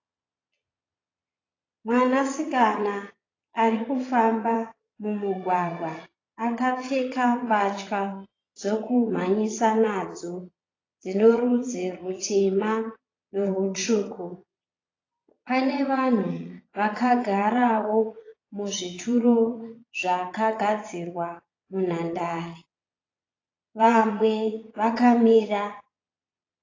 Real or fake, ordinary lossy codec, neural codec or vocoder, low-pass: fake; AAC, 32 kbps; vocoder, 22.05 kHz, 80 mel bands, Vocos; 7.2 kHz